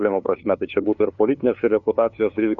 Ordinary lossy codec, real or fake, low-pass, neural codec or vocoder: Opus, 64 kbps; fake; 7.2 kHz; codec, 16 kHz, 2 kbps, FunCodec, trained on LibriTTS, 25 frames a second